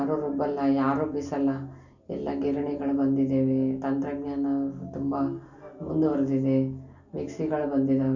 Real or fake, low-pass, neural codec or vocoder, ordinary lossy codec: real; 7.2 kHz; none; none